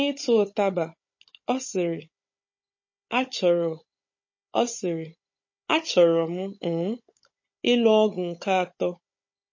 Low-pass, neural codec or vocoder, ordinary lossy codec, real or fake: 7.2 kHz; codec, 16 kHz, 16 kbps, FunCodec, trained on Chinese and English, 50 frames a second; MP3, 32 kbps; fake